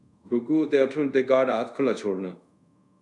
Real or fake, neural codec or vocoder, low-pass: fake; codec, 24 kHz, 0.5 kbps, DualCodec; 10.8 kHz